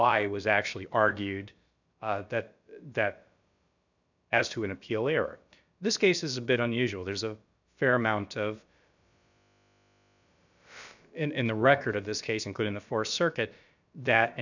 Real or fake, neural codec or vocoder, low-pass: fake; codec, 16 kHz, about 1 kbps, DyCAST, with the encoder's durations; 7.2 kHz